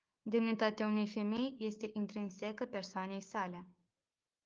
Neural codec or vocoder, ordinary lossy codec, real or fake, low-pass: codec, 16 kHz, 6 kbps, DAC; Opus, 24 kbps; fake; 7.2 kHz